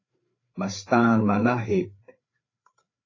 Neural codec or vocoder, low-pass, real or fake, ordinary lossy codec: codec, 16 kHz, 4 kbps, FreqCodec, larger model; 7.2 kHz; fake; AAC, 32 kbps